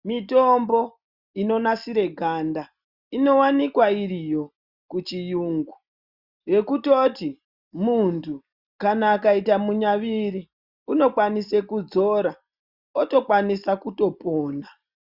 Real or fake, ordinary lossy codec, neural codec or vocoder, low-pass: real; Opus, 64 kbps; none; 5.4 kHz